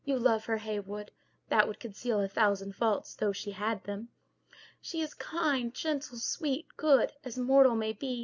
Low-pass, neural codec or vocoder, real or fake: 7.2 kHz; none; real